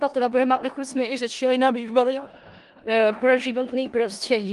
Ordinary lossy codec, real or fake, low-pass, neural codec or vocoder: Opus, 24 kbps; fake; 10.8 kHz; codec, 16 kHz in and 24 kHz out, 0.4 kbps, LongCat-Audio-Codec, four codebook decoder